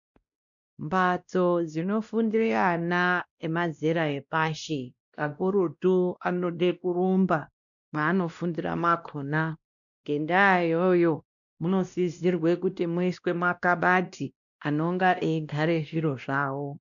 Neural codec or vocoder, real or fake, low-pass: codec, 16 kHz, 1 kbps, X-Codec, WavLM features, trained on Multilingual LibriSpeech; fake; 7.2 kHz